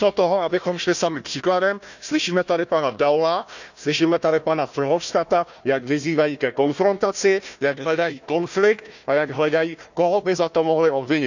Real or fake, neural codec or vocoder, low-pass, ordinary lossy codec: fake; codec, 16 kHz, 1 kbps, FunCodec, trained on Chinese and English, 50 frames a second; 7.2 kHz; none